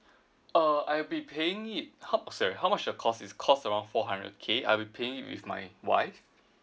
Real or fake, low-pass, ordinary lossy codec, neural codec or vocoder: real; none; none; none